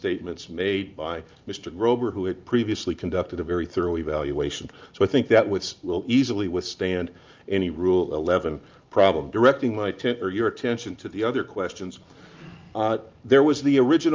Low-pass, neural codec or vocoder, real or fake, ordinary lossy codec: 7.2 kHz; none; real; Opus, 24 kbps